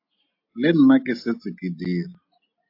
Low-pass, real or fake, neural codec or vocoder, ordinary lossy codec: 5.4 kHz; real; none; AAC, 32 kbps